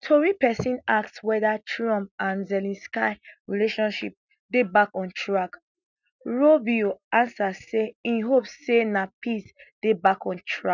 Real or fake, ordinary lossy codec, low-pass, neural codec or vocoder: real; none; 7.2 kHz; none